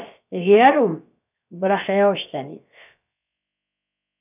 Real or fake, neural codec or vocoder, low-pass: fake; codec, 16 kHz, about 1 kbps, DyCAST, with the encoder's durations; 3.6 kHz